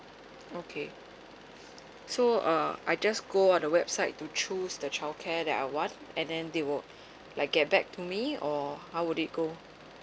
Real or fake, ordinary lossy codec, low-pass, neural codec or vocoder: real; none; none; none